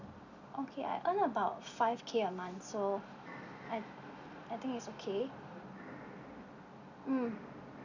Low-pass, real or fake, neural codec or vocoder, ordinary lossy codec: 7.2 kHz; real; none; none